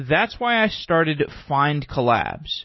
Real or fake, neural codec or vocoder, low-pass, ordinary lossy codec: real; none; 7.2 kHz; MP3, 24 kbps